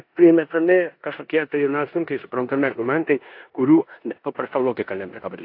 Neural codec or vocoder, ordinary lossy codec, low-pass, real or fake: codec, 16 kHz in and 24 kHz out, 0.9 kbps, LongCat-Audio-Codec, four codebook decoder; AAC, 32 kbps; 5.4 kHz; fake